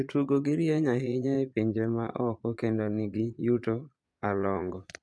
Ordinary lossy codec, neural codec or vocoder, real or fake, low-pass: none; vocoder, 22.05 kHz, 80 mel bands, Vocos; fake; none